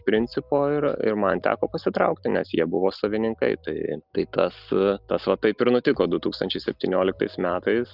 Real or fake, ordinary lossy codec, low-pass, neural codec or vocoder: real; Opus, 24 kbps; 5.4 kHz; none